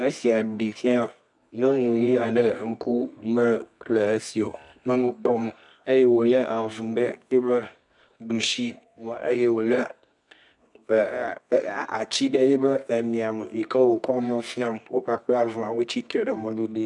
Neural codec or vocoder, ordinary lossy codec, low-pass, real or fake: codec, 24 kHz, 0.9 kbps, WavTokenizer, medium music audio release; MP3, 96 kbps; 10.8 kHz; fake